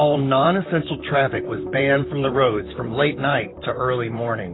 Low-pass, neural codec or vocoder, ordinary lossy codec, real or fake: 7.2 kHz; vocoder, 44.1 kHz, 128 mel bands, Pupu-Vocoder; AAC, 16 kbps; fake